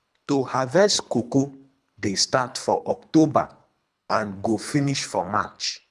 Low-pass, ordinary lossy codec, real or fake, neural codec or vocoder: none; none; fake; codec, 24 kHz, 3 kbps, HILCodec